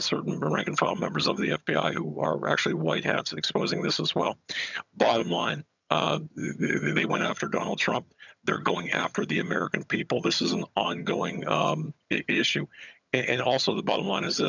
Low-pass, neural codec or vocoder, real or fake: 7.2 kHz; vocoder, 22.05 kHz, 80 mel bands, HiFi-GAN; fake